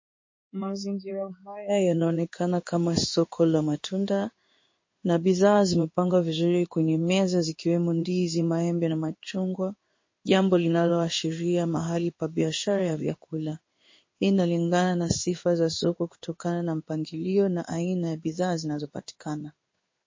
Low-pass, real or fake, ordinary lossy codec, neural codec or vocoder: 7.2 kHz; fake; MP3, 32 kbps; codec, 16 kHz in and 24 kHz out, 1 kbps, XY-Tokenizer